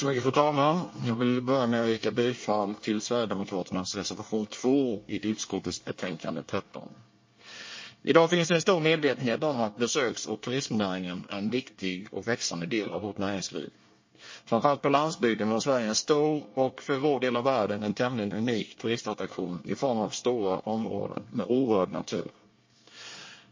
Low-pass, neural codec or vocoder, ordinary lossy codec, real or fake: 7.2 kHz; codec, 24 kHz, 1 kbps, SNAC; MP3, 32 kbps; fake